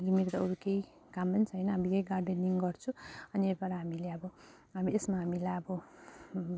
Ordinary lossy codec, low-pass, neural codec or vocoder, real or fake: none; none; none; real